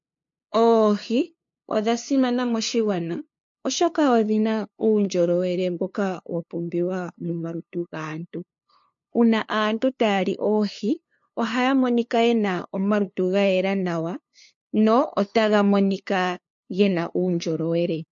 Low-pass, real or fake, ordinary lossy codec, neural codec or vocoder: 7.2 kHz; fake; MP3, 48 kbps; codec, 16 kHz, 2 kbps, FunCodec, trained on LibriTTS, 25 frames a second